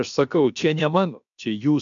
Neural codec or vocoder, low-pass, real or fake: codec, 16 kHz, about 1 kbps, DyCAST, with the encoder's durations; 7.2 kHz; fake